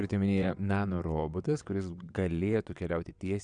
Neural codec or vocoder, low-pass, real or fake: vocoder, 22.05 kHz, 80 mel bands, WaveNeXt; 9.9 kHz; fake